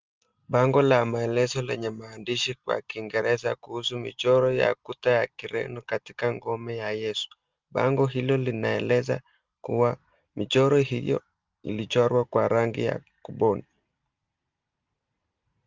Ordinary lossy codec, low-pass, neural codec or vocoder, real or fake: Opus, 32 kbps; 7.2 kHz; none; real